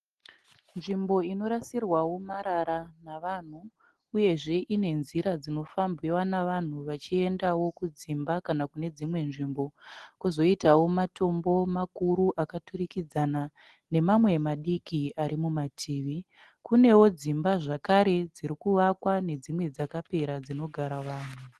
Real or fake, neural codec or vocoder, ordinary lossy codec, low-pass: real; none; Opus, 16 kbps; 10.8 kHz